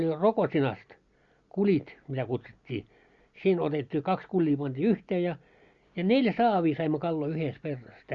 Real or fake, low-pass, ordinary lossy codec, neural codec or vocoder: real; 7.2 kHz; Opus, 64 kbps; none